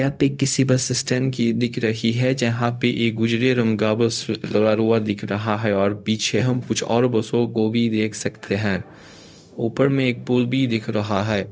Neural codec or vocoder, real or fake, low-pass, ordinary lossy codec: codec, 16 kHz, 0.4 kbps, LongCat-Audio-Codec; fake; none; none